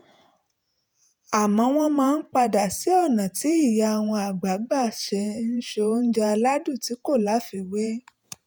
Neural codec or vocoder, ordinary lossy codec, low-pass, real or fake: vocoder, 48 kHz, 128 mel bands, Vocos; none; none; fake